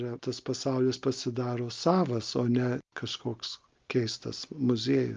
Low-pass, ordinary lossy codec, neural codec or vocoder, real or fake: 7.2 kHz; Opus, 24 kbps; none; real